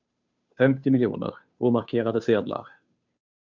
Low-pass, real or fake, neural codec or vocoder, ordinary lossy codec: 7.2 kHz; fake; codec, 16 kHz, 2 kbps, FunCodec, trained on Chinese and English, 25 frames a second; MP3, 64 kbps